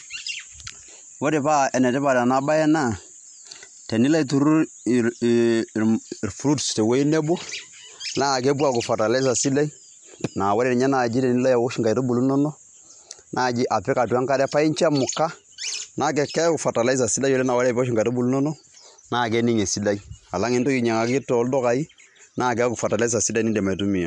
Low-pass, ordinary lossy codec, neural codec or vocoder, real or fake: 10.8 kHz; MP3, 64 kbps; none; real